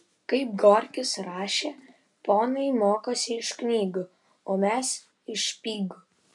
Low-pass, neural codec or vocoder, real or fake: 10.8 kHz; none; real